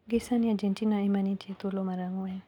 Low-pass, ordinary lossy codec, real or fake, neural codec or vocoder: 19.8 kHz; none; real; none